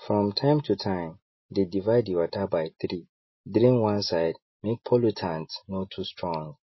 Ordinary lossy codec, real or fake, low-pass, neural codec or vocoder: MP3, 24 kbps; real; 7.2 kHz; none